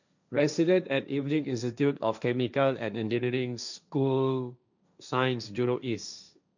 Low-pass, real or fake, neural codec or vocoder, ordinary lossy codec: 7.2 kHz; fake; codec, 16 kHz, 1.1 kbps, Voila-Tokenizer; none